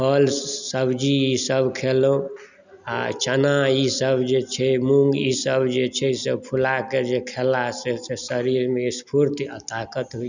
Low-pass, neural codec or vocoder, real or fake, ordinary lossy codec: 7.2 kHz; none; real; none